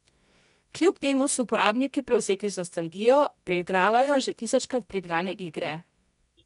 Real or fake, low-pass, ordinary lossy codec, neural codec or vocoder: fake; 10.8 kHz; none; codec, 24 kHz, 0.9 kbps, WavTokenizer, medium music audio release